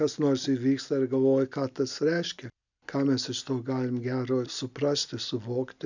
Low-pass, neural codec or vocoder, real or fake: 7.2 kHz; none; real